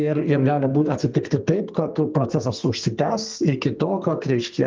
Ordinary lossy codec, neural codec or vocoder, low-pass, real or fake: Opus, 32 kbps; codec, 32 kHz, 1.9 kbps, SNAC; 7.2 kHz; fake